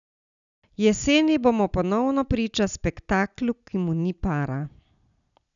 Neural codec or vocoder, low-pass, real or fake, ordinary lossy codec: none; 7.2 kHz; real; none